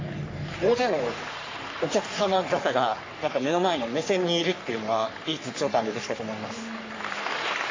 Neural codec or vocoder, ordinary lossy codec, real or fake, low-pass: codec, 44.1 kHz, 3.4 kbps, Pupu-Codec; AAC, 32 kbps; fake; 7.2 kHz